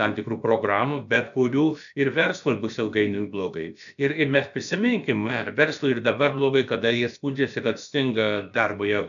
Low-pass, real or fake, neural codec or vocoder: 7.2 kHz; fake; codec, 16 kHz, about 1 kbps, DyCAST, with the encoder's durations